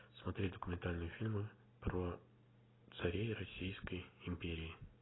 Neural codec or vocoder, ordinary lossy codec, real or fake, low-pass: none; AAC, 16 kbps; real; 7.2 kHz